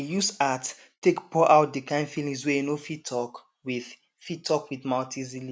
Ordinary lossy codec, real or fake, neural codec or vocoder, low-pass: none; real; none; none